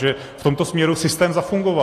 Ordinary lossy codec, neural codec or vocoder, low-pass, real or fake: AAC, 48 kbps; none; 14.4 kHz; real